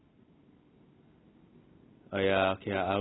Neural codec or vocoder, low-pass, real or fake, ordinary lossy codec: none; 7.2 kHz; real; AAC, 16 kbps